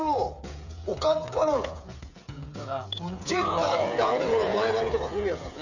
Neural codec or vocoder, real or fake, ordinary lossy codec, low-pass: codec, 16 kHz, 8 kbps, FreqCodec, smaller model; fake; none; 7.2 kHz